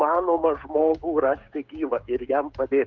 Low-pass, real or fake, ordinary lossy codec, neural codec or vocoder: 7.2 kHz; fake; Opus, 32 kbps; codec, 16 kHz, 16 kbps, FreqCodec, smaller model